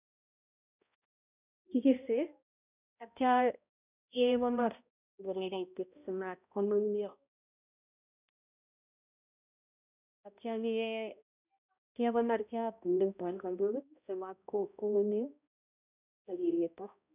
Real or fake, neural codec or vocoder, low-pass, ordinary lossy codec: fake; codec, 16 kHz, 0.5 kbps, X-Codec, HuBERT features, trained on balanced general audio; 3.6 kHz; none